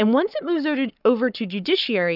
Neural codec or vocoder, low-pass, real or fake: codec, 44.1 kHz, 7.8 kbps, Pupu-Codec; 5.4 kHz; fake